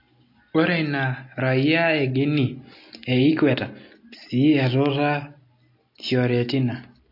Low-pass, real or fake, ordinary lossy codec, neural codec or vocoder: 5.4 kHz; real; MP3, 32 kbps; none